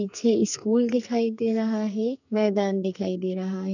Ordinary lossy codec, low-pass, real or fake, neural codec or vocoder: none; 7.2 kHz; fake; codec, 44.1 kHz, 2.6 kbps, SNAC